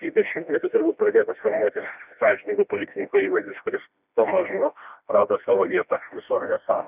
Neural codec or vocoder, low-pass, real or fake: codec, 16 kHz, 1 kbps, FreqCodec, smaller model; 3.6 kHz; fake